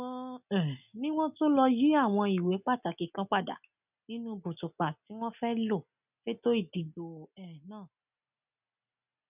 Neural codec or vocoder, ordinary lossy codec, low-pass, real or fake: none; none; 3.6 kHz; real